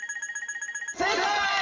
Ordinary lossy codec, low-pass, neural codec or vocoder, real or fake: AAC, 32 kbps; 7.2 kHz; none; real